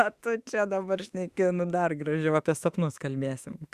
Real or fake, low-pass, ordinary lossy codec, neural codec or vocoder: fake; 14.4 kHz; Opus, 64 kbps; autoencoder, 48 kHz, 32 numbers a frame, DAC-VAE, trained on Japanese speech